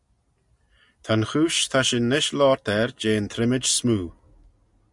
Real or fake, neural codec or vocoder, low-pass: real; none; 10.8 kHz